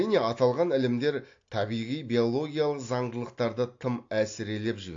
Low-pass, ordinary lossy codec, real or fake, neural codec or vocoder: 7.2 kHz; AAC, 48 kbps; real; none